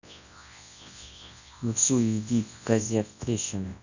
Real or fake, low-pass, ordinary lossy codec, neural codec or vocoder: fake; 7.2 kHz; none; codec, 24 kHz, 0.9 kbps, WavTokenizer, large speech release